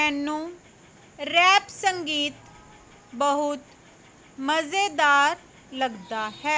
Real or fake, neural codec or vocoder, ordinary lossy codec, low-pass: real; none; none; none